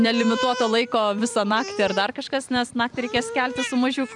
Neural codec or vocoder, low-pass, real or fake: vocoder, 44.1 kHz, 128 mel bands every 256 samples, BigVGAN v2; 10.8 kHz; fake